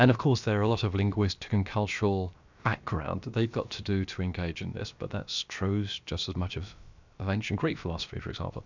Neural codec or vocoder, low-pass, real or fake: codec, 16 kHz, about 1 kbps, DyCAST, with the encoder's durations; 7.2 kHz; fake